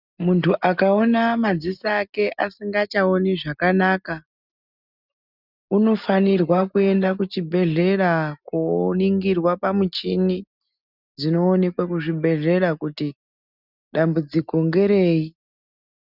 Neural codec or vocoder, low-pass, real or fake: none; 5.4 kHz; real